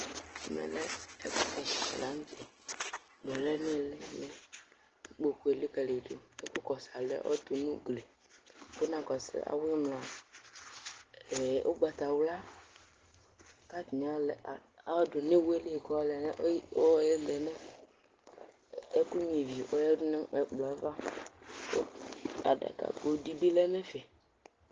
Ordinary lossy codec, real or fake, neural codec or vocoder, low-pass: Opus, 16 kbps; real; none; 7.2 kHz